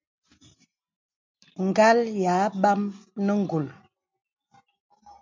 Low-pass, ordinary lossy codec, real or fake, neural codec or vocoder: 7.2 kHz; MP3, 64 kbps; real; none